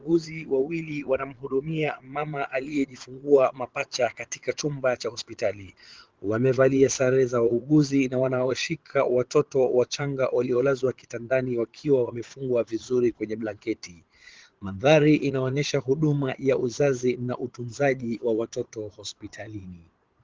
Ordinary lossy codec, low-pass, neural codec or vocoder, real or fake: Opus, 16 kbps; 7.2 kHz; vocoder, 22.05 kHz, 80 mel bands, Vocos; fake